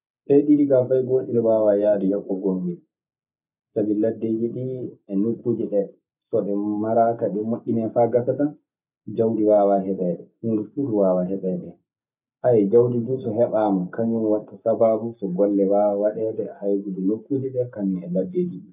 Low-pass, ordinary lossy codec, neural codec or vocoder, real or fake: 3.6 kHz; none; none; real